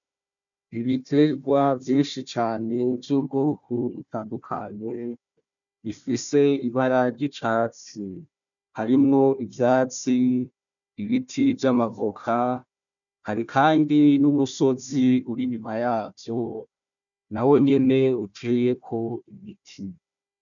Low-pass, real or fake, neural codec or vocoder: 7.2 kHz; fake; codec, 16 kHz, 1 kbps, FunCodec, trained on Chinese and English, 50 frames a second